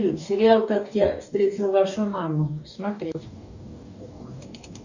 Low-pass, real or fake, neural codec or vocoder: 7.2 kHz; fake; codec, 44.1 kHz, 2.6 kbps, DAC